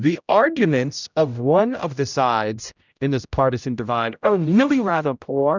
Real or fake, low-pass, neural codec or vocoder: fake; 7.2 kHz; codec, 16 kHz, 0.5 kbps, X-Codec, HuBERT features, trained on general audio